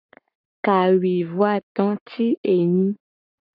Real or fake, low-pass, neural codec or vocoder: fake; 5.4 kHz; codec, 44.1 kHz, 7.8 kbps, Pupu-Codec